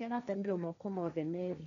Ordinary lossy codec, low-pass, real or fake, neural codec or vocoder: none; 7.2 kHz; fake; codec, 16 kHz, 1.1 kbps, Voila-Tokenizer